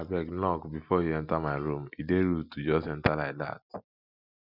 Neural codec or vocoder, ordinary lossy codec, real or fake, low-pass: none; none; real; 5.4 kHz